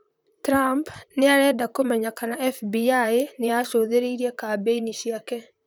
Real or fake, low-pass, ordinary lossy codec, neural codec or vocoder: fake; none; none; vocoder, 44.1 kHz, 128 mel bands, Pupu-Vocoder